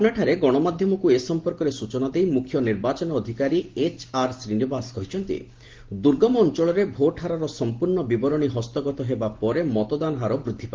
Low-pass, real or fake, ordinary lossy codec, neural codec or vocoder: 7.2 kHz; real; Opus, 16 kbps; none